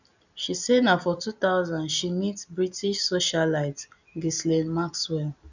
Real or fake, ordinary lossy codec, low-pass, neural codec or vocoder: real; none; 7.2 kHz; none